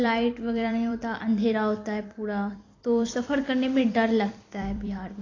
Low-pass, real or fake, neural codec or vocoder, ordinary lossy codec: 7.2 kHz; fake; vocoder, 44.1 kHz, 128 mel bands every 256 samples, BigVGAN v2; AAC, 32 kbps